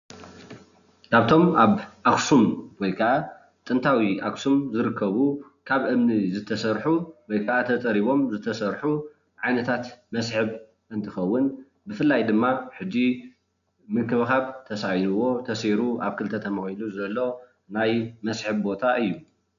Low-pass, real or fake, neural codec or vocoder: 7.2 kHz; real; none